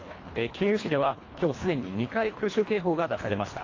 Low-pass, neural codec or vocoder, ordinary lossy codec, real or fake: 7.2 kHz; codec, 24 kHz, 1.5 kbps, HILCodec; AAC, 32 kbps; fake